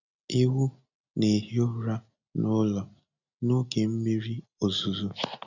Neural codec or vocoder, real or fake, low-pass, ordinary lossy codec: none; real; 7.2 kHz; none